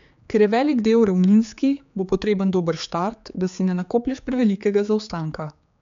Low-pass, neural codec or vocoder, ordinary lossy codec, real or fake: 7.2 kHz; codec, 16 kHz, 4 kbps, X-Codec, HuBERT features, trained on general audio; MP3, 64 kbps; fake